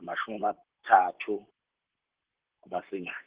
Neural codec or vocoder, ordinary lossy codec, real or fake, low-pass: vocoder, 44.1 kHz, 128 mel bands, Pupu-Vocoder; Opus, 16 kbps; fake; 3.6 kHz